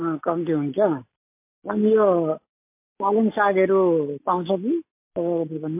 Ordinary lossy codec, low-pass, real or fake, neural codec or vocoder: MP3, 24 kbps; 3.6 kHz; real; none